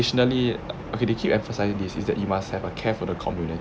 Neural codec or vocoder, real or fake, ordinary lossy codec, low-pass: none; real; none; none